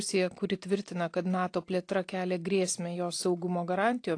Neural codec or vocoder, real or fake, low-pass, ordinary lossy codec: none; real; 9.9 kHz; AAC, 48 kbps